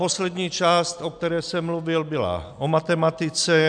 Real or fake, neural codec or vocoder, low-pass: real; none; 9.9 kHz